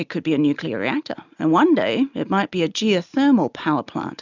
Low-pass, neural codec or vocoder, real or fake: 7.2 kHz; none; real